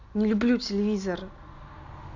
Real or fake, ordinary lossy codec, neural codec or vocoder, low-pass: real; none; none; 7.2 kHz